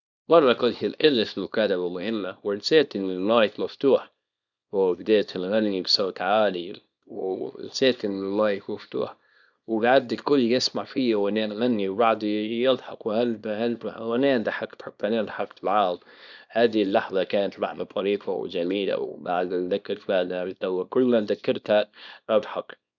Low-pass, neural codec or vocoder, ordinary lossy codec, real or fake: 7.2 kHz; codec, 24 kHz, 0.9 kbps, WavTokenizer, small release; none; fake